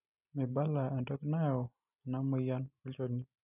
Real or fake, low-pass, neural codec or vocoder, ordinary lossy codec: real; 3.6 kHz; none; none